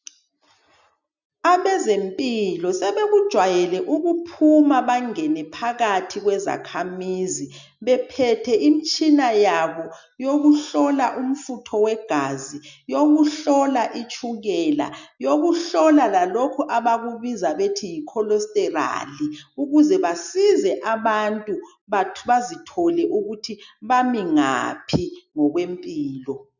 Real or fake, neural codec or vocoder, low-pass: real; none; 7.2 kHz